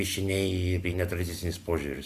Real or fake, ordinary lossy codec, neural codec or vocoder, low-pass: real; MP3, 96 kbps; none; 14.4 kHz